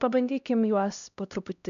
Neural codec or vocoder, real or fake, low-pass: codec, 16 kHz, 1 kbps, X-Codec, HuBERT features, trained on LibriSpeech; fake; 7.2 kHz